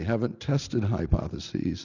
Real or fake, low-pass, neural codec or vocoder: real; 7.2 kHz; none